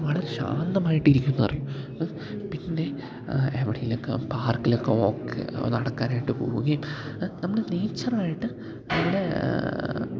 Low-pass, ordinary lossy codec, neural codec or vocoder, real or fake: none; none; none; real